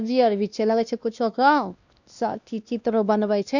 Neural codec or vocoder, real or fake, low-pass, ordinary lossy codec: codec, 16 kHz, 1 kbps, X-Codec, WavLM features, trained on Multilingual LibriSpeech; fake; 7.2 kHz; none